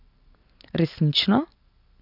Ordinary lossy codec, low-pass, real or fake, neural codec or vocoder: none; 5.4 kHz; real; none